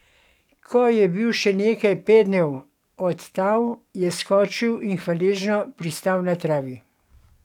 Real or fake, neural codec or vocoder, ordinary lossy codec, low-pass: fake; codec, 44.1 kHz, 7.8 kbps, DAC; none; 19.8 kHz